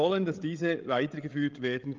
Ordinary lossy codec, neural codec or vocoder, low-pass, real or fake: Opus, 32 kbps; codec, 16 kHz, 16 kbps, FreqCodec, larger model; 7.2 kHz; fake